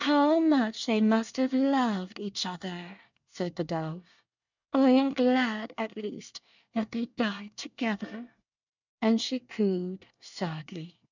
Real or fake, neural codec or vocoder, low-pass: fake; codec, 24 kHz, 1 kbps, SNAC; 7.2 kHz